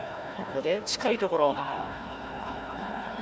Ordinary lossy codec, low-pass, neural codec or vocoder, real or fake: none; none; codec, 16 kHz, 1 kbps, FunCodec, trained on Chinese and English, 50 frames a second; fake